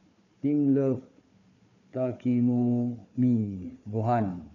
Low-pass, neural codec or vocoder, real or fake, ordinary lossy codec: 7.2 kHz; codec, 16 kHz, 4 kbps, FunCodec, trained on Chinese and English, 50 frames a second; fake; none